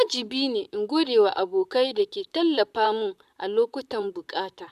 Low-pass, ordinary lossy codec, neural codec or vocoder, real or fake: 14.4 kHz; none; vocoder, 44.1 kHz, 128 mel bands, Pupu-Vocoder; fake